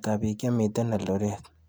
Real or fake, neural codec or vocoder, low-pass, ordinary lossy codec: real; none; none; none